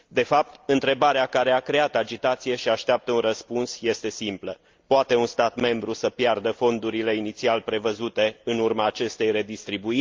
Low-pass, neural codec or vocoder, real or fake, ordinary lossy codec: 7.2 kHz; none; real; Opus, 24 kbps